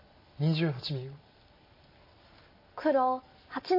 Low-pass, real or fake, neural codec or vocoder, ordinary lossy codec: 5.4 kHz; real; none; MP3, 24 kbps